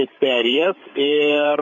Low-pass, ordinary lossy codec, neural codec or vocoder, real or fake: 7.2 kHz; AAC, 48 kbps; codec, 16 kHz, 8 kbps, FreqCodec, larger model; fake